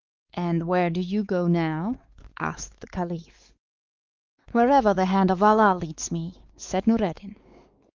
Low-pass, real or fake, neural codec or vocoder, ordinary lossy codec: 7.2 kHz; fake; codec, 16 kHz, 4 kbps, X-Codec, WavLM features, trained on Multilingual LibriSpeech; Opus, 32 kbps